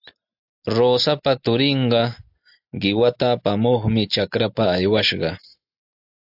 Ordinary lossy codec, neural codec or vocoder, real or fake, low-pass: AAC, 48 kbps; none; real; 5.4 kHz